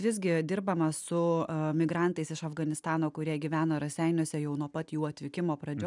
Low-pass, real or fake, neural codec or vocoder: 10.8 kHz; real; none